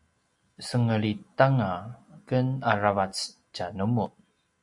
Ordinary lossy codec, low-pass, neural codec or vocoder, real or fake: AAC, 64 kbps; 10.8 kHz; none; real